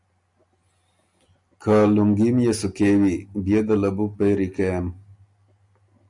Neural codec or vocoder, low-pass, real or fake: none; 10.8 kHz; real